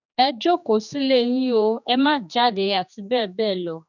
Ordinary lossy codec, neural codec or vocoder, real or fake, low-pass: none; codec, 16 kHz, 2 kbps, X-Codec, HuBERT features, trained on general audio; fake; 7.2 kHz